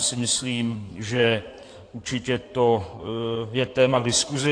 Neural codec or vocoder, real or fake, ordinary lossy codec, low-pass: codec, 16 kHz in and 24 kHz out, 2.2 kbps, FireRedTTS-2 codec; fake; AAC, 48 kbps; 9.9 kHz